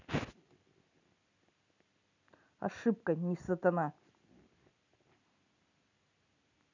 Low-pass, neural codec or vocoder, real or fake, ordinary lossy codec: 7.2 kHz; none; real; none